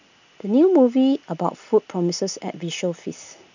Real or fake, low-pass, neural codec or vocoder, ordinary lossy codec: real; 7.2 kHz; none; none